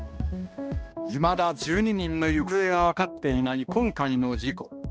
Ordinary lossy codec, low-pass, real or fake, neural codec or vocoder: none; none; fake; codec, 16 kHz, 1 kbps, X-Codec, HuBERT features, trained on balanced general audio